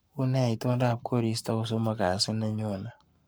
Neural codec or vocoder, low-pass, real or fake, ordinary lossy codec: codec, 44.1 kHz, 7.8 kbps, Pupu-Codec; none; fake; none